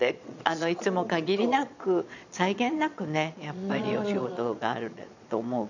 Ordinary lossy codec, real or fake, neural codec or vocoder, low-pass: none; fake; vocoder, 22.05 kHz, 80 mel bands, Vocos; 7.2 kHz